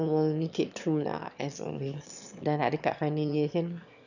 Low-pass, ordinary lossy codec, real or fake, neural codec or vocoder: 7.2 kHz; none; fake; autoencoder, 22.05 kHz, a latent of 192 numbers a frame, VITS, trained on one speaker